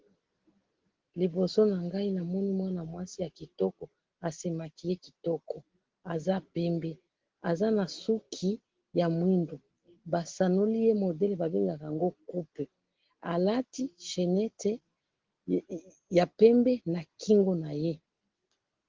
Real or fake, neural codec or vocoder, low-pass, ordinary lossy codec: real; none; 7.2 kHz; Opus, 16 kbps